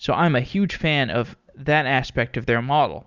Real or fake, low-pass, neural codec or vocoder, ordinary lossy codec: real; 7.2 kHz; none; Opus, 64 kbps